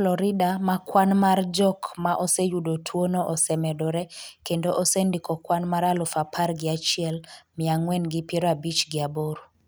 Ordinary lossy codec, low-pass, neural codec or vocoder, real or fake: none; none; none; real